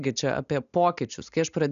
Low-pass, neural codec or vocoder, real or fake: 7.2 kHz; none; real